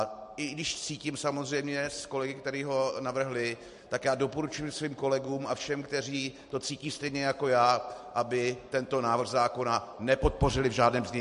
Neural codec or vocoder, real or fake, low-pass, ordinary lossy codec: none; real; 10.8 kHz; MP3, 48 kbps